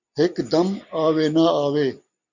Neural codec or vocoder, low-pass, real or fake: none; 7.2 kHz; real